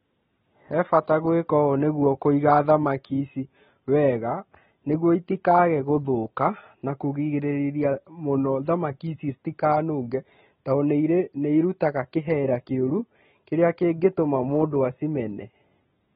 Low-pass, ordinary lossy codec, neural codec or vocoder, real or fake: 7.2 kHz; AAC, 16 kbps; none; real